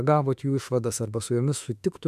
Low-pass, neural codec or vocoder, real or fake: 14.4 kHz; autoencoder, 48 kHz, 32 numbers a frame, DAC-VAE, trained on Japanese speech; fake